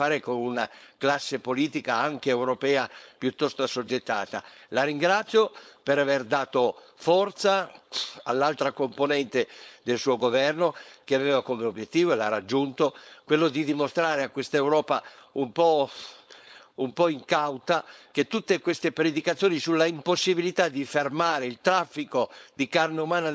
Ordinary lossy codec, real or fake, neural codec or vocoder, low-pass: none; fake; codec, 16 kHz, 4.8 kbps, FACodec; none